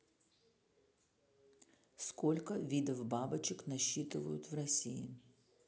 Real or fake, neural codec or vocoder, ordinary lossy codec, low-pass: real; none; none; none